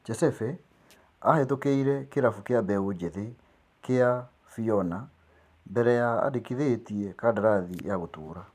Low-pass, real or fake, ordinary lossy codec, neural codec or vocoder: 14.4 kHz; fake; none; vocoder, 44.1 kHz, 128 mel bands every 512 samples, BigVGAN v2